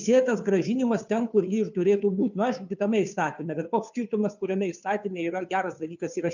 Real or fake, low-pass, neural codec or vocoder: fake; 7.2 kHz; codec, 16 kHz, 2 kbps, FunCodec, trained on Chinese and English, 25 frames a second